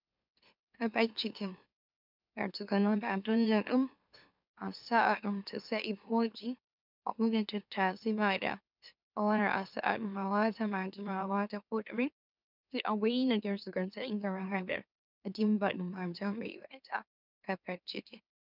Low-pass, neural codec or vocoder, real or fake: 5.4 kHz; autoencoder, 44.1 kHz, a latent of 192 numbers a frame, MeloTTS; fake